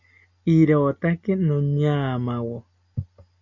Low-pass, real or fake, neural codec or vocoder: 7.2 kHz; real; none